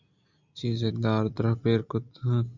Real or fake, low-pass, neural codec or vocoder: real; 7.2 kHz; none